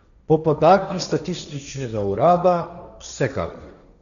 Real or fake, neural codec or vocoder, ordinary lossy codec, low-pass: fake; codec, 16 kHz, 1.1 kbps, Voila-Tokenizer; none; 7.2 kHz